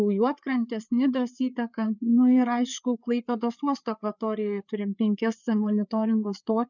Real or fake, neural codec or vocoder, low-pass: fake; codec, 16 kHz, 4 kbps, FreqCodec, larger model; 7.2 kHz